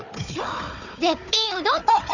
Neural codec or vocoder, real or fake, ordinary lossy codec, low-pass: codec, 16 kHz, 4 kbps, FunCodec, trained on Chinese and English, 50 frames a second; fake; none; 7.2 kHz